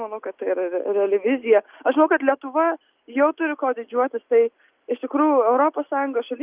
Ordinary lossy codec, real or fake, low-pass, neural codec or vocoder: Opus, 24 kbps; real; 3.6 kHz; none